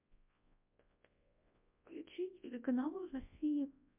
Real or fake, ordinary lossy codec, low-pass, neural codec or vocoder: fake; MP3, 32 kbps; 3.6 kHz; codec, 24 kHz, 0.9 kbps, WavTokenizer, large speech release